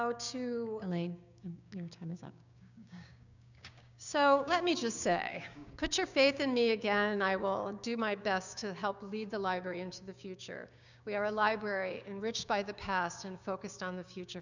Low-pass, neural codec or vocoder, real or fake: 7.2 kHz; codec, 16 kHz, 6 kbps, DAC; fake